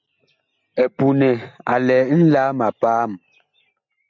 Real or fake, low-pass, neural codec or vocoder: real; 7.2 kHz; none